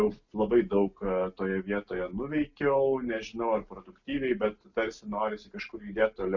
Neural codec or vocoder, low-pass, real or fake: none; 7.2 kHz; real